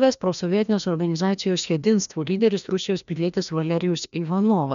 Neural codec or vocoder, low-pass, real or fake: codec, 16 kHz, 1 kbps, FreqCodec, larger model; 7.2 kHz; fake